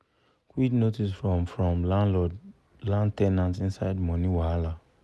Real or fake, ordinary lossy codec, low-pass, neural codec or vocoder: real; none; none; none